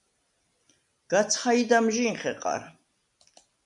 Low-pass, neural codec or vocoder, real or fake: 10.8 kHz; none; real